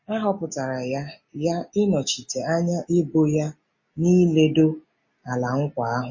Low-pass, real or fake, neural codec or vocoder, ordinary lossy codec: 7.2 kHz; real; none; MP3, 32 kbps